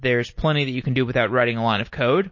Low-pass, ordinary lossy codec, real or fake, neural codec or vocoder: 7.2 kHz; MP3, 32 kbps; real; none